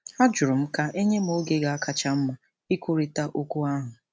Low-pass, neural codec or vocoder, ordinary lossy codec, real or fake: none; none; none; real